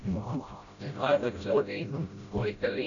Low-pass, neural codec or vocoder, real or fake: 7.2 kHz; codec, 16 kHz, 0.5 kbps, FreqCodec, smaller model; fake